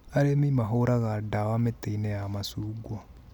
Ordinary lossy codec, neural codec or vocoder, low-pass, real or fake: none; none; 19.8 kHz; real